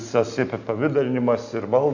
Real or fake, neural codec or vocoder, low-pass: real; none; 7.2 kHz